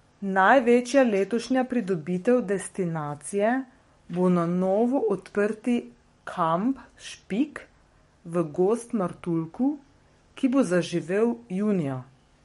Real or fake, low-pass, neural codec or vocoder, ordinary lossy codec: fake; 19.8 kHz; codec, 44.1 kHz, 7.8 kbps, DAC; MP3, 48 kbps